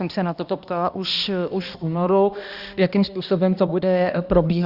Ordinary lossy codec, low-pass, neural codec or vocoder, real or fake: Opus, 64 kbps; 5.4 kHz; codec, 16 kHz, 1 kbps, X-Codec, HuBERT features, trained on balanced general audio; fake